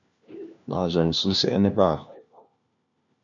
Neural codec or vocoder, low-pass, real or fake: codec, 16 kHz, 1 kbps, FunCodec, trained on LibriTTS, 50 frames a second; 7.2 kHz; fake